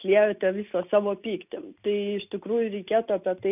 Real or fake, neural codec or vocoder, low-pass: real; none; 3.6 kHz